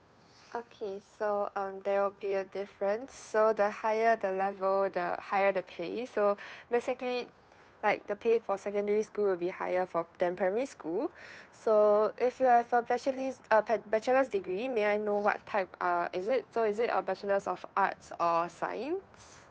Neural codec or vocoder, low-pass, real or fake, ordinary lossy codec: codec, 16 kHz, 2 kbps, FunCodec, trained on Chinese and English, 25 frames a second; none; fake; none